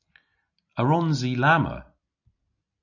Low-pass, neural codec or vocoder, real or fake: 7.2 kHz; none; real